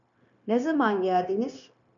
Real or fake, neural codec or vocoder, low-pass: fake; codec, 16 kHz, 0.9 kbps, LongCat-Audio-Codec; 7.2 kHz